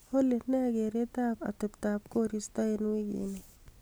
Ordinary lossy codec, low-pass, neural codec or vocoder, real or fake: none; none; none; real